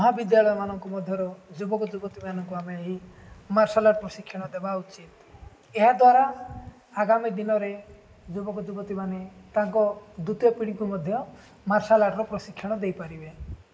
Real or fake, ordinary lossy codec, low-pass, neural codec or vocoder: real; none; none; none